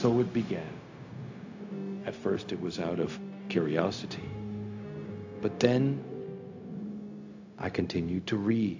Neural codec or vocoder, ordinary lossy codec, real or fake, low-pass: codec, 16 kHz, 0.4 kbps, LongCat-Audio-Codec; AAC, 48 kbps; fake; 7.2 kHz